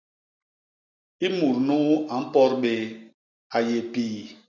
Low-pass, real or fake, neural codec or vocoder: 7.2 kHz; real; none